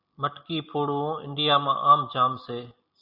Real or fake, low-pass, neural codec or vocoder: real; 5.4 kHz; none